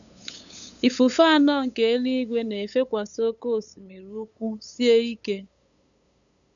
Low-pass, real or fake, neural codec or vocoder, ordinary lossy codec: 7.2 kHz; fake; codec, 16 kHz, 8 kbps, FunCodec, trained on LibriTTS, 25 frames a second; none